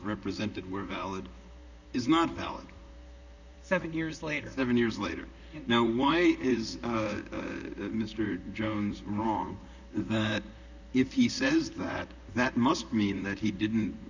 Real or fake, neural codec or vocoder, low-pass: fake; vocoder, 44.1 kHz, 128 mel bands, Pupu-Vocoder; 7.2 kHz